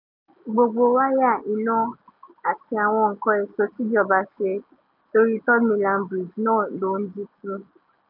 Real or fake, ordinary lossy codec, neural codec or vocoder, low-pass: real; none; none; 5.4 kHz